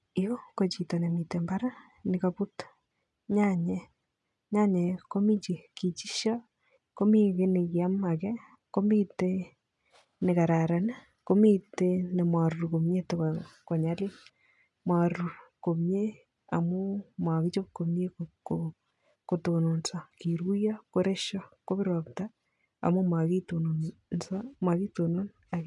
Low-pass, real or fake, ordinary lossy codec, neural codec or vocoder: 10.8 kHz; real; none; none